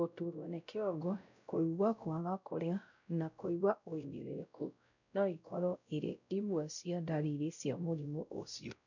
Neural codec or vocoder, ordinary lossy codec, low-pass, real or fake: codec, 16 kHz, 0.5 kbps, X-Codec, WavLM features, trained on Multilingual LibriSpeech; none; 7.2 kHz; fake